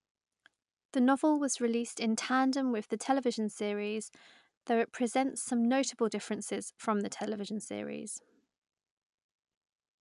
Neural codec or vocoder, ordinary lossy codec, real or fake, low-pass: none; none; real; 10.8 kHz